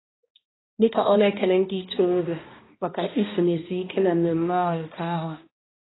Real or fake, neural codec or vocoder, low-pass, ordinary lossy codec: fake; codec, 16 kHz, 1 kbps, X-Codec, HuBERT features, trained on balanced general audio; 7.2 kHz; AAC, 16 kbps